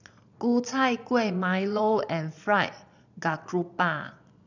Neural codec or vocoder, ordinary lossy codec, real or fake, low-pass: vocoder, 22.05 kHz, 80 mel bands, Vocos; none; fake; 7.2 kHz